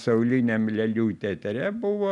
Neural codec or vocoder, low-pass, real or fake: none; 10.8 kHz; real